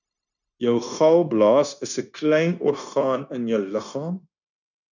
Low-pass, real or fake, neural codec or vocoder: 7.2 kHz; fake; codec, 16 kHz, 0.9 kbps, LongCat-Audio-Codec